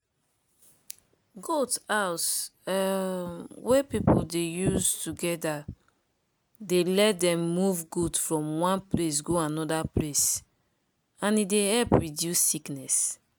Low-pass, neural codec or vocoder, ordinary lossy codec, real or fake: none; none; none; real